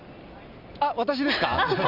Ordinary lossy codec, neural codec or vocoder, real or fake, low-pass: none; none; real; 5.4 kHz